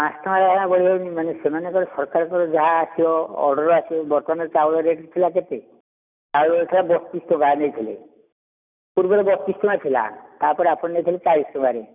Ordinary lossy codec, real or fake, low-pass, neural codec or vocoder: none; real; 3.6 kHz; none